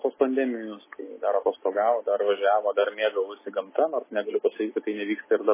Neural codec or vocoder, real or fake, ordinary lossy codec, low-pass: none; real; MP3, 16 kbps; 3.6 kHz